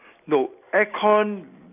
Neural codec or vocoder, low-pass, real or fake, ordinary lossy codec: none; 3.6 kHz; real; none